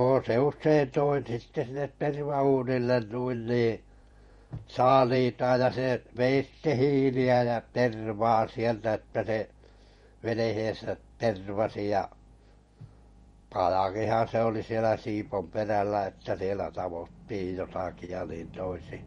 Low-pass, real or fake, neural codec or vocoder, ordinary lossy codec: 19.8 kHz; fake; vocoder, 48 kHz, 128 mel bands, Vocos; MP3, 48 kbps